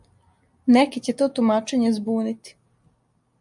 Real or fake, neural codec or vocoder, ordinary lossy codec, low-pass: real; none; MP3, 96 kbps; 10.8 kHz